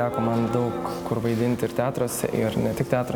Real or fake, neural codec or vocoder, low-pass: real; none; 19.8 kHz